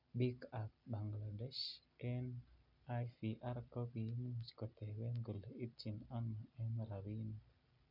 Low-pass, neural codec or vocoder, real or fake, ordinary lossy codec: 5.4 kHz; none; real; none